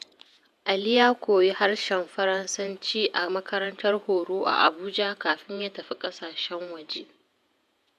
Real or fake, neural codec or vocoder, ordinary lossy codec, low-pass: fake; vocoder, 48 kHz, 128 mel bands, Vocos; none; 14.4 kHz